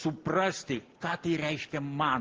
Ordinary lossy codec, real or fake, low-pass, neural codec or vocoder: Opus, 16 kbps; real; 7.2 kHz; none